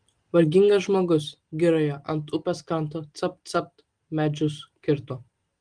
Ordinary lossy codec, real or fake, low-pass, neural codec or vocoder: Opus, 24 kbps; real; 9.9 kHz; none